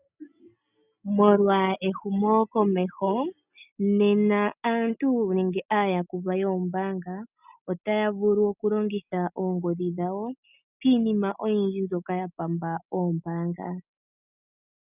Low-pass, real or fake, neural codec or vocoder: 3.6 kHz; real; none